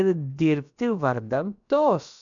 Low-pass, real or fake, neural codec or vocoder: 7.2 kHz; fake; codec, 16 kHz, about 1 kbps, DyCAST, with the encoder's durations